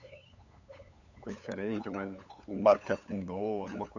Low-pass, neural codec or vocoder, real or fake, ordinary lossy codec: 7.2 kHz; codec, 16 kHz, 8 kbps, FunCodec, trained on LibriTTS, 25 frames a second; fake; none